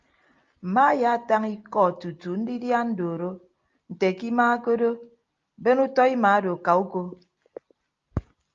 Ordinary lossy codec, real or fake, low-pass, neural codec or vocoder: Opus, 32 kbps; real; 7.2 kHz; none